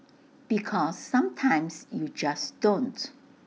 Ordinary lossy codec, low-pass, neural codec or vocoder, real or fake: none; none; none; real